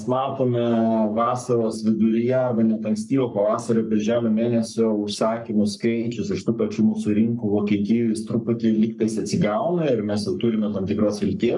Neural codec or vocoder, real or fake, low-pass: codec, 44.1 kHz, 3.4 kbps, Pupu-Codec; fake; 10.8 kHz